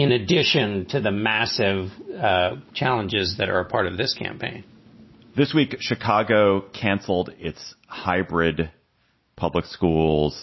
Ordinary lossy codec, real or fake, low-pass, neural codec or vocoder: MP3, 24 kbps; real; 7.2 kHz; none